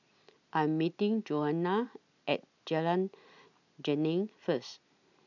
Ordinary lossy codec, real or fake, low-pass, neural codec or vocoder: none; real; 7.2 kHz; none